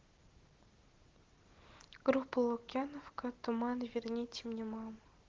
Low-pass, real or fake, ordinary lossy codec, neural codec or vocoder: 7.2 kHz; real; Opus, 16 kbps; none